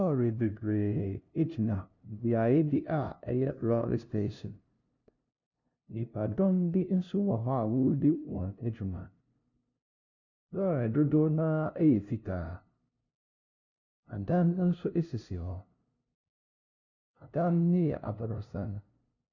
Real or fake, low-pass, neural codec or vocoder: fake; 7.2 kHz; codec, 16 kHz, 0.5 kbps, FunCodec, trained on LibriTTS, 25 frames a second